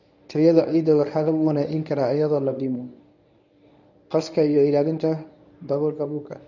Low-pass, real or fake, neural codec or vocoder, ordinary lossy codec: 7.2 kHz; fake; codec, 24 kHz, 0.9 kbps, WavTokenizer, medium speech release version 1; none